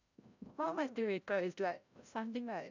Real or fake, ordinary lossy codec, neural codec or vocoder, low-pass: fake; none; codec, 16 kHz, 0.5 kbps, FreqCodec, larger model; 7.2 kHz